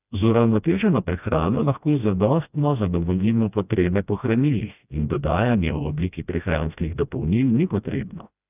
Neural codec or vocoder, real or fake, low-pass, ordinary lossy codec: codec, 16 kHz, 1 kbps, FreqCodec, smaller model; fake; 3.6 kHz; none